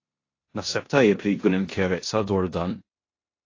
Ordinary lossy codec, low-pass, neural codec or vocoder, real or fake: AAC, 32 kbps; 7.2 kHz; codec, 16 kHz in and 24 kHz out, 0.9 kbps, LongCat-Audio-Codec, four codebook decoder; fake